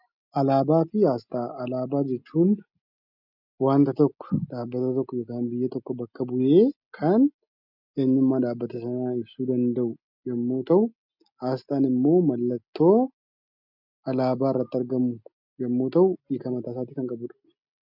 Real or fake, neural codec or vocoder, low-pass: real; none; 5.4 kHz